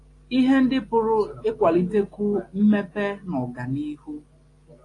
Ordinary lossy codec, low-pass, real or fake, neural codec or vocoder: AAC, 48 kbps; 10.8 kHz; real; none